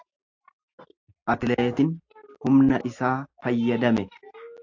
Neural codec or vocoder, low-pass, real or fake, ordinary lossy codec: none; 7.2 kHz; real; AAC, 48 kbps